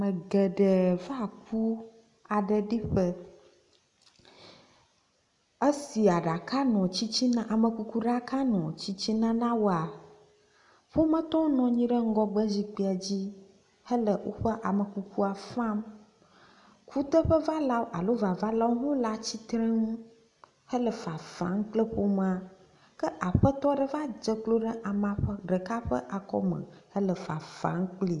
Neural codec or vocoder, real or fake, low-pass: none; real; 10.8 kHz